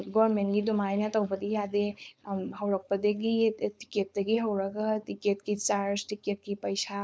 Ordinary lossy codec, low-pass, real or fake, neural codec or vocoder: none; none; fake; codec, 16 kHz, 4.8 kbps, FACodec